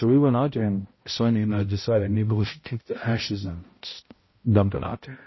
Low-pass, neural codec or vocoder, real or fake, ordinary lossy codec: 7.2 kHz; codec, 16 kHz, 0.5 kbps, X-Codec, HuBERT features, trained on balanced general audio; fake; MP3, 24 kbps